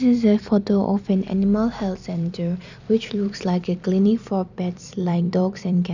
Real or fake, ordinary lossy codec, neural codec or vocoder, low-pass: fake; none; vocoder, 44.1 kHz, 128 mel bands every 256 samples, BigVGAN v2; 7.2 kHz